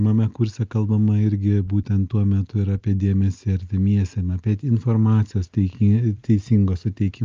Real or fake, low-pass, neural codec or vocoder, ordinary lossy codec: real; 7.2 kHz; none; Opus, 24 kbps